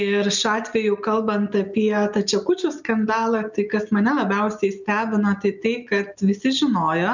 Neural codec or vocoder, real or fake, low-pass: none; real; 7.2 kHz